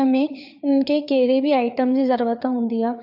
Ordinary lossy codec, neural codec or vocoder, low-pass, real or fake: none; codec, 16 kHz, 4 kbps, FreqCodec, larger model; 5.4 kHz; fake